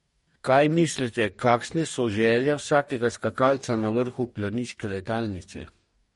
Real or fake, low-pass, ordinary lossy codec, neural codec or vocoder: fake; 19.8 kHz; MP3, 48 kbps; codec, 44.1 kHz, 2.6 kbps, DAC